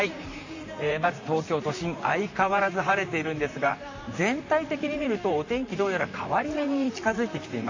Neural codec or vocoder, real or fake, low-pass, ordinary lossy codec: vocoder, 44.1 kHz, 128 mel bands, Pupu-Vocoder; fake; 7.2 kHz; AAC, 48 kbps